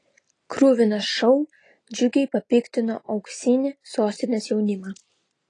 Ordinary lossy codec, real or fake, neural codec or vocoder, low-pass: AAC, 32 kbps; real; none; 9.9 kHz